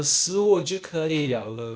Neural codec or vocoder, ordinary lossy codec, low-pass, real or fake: codec, 16 kHz, 0.8 kbps, ZipCodec; none; none; fake